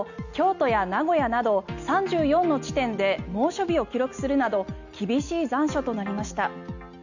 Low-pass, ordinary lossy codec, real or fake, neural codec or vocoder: 7.2 kHz; none; real; none